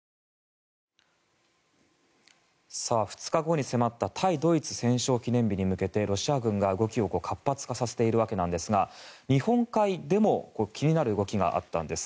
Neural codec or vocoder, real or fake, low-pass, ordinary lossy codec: none; real; none; none